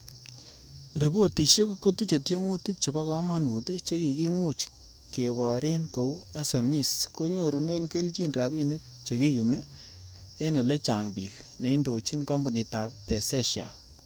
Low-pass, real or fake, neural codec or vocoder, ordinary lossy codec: none; fake; codec, 44.1 kHz, 2.6 kbps, DAC; none